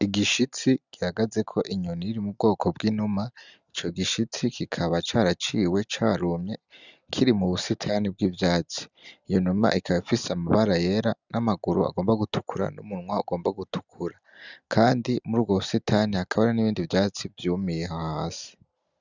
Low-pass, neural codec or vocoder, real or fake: 7.2 kHz; none; real